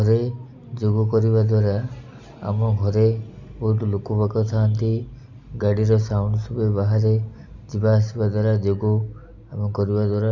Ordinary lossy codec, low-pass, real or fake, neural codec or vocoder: none; 7.2 kHz; real; none